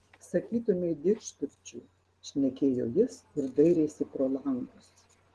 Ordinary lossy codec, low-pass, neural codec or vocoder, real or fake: Opus, 16 kbps; 10.8 kHz; none; real